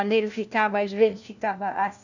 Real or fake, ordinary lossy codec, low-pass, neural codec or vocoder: fake; none; 7.2 kHz; codec, 16 kHz, 1 kbps, FunCodec, trained on LibriTTS, 50 frames a second